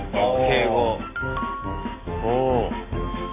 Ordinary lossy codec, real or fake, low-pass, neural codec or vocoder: AAC, 16 kbps; real; 3.6 kHz; none